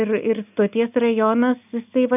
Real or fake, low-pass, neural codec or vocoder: real; 3.6 kHz; none